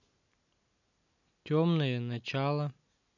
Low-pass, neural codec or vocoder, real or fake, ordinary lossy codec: 7.2 kHz; none; real; none